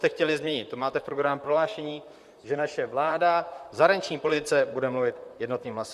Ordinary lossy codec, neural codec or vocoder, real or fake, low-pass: Opus, 64 kbps; vocoder, 44.1 kHz, 128 mel bands, Pupu-Vocoder; fake; 14.4 kHz